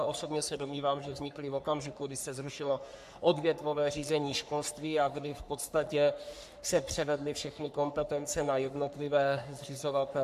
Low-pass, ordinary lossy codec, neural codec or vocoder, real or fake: 14.4 kHz; AAC, 96 kbps; codec, 44.1 kHz, 3.4 kbps, Pupu-Codec; fake